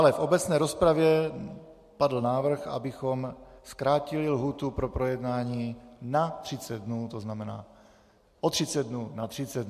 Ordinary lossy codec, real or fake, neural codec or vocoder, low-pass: MP3, 64 kbps; real; none; 14.4 kHz